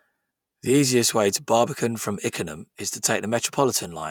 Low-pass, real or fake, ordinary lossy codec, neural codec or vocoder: none; fake; none; vocoder, 48 kHz, 128 mel bands, Vocos